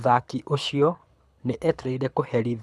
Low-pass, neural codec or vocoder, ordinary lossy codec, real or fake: none; codec, 24 kHz, 6 kbps, HILCodec; none; fake